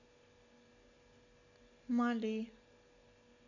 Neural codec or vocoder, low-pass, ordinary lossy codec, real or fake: none; 7.2 kHz; none; real